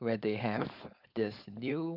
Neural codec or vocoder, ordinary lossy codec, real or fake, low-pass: codec, 16 kHz, 4.8 kbps, FACodec; none; fake; 5.4 kHz